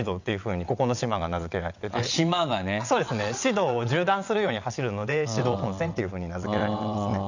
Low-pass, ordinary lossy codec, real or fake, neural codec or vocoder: 7.2 kHz; none; fake; vocoder, 22.05 kHz, 80 mel bands, WaveNeXt